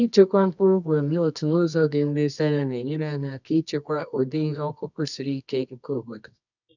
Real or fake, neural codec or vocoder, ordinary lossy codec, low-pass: fake; codec, 24 kHz, 0.9 kbps, WavTokenizer, medium music audio release; none; 7.2 kHz